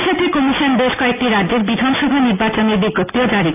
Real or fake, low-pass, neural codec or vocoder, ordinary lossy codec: real; 3.6 kHz; none; AAC, 24 kbps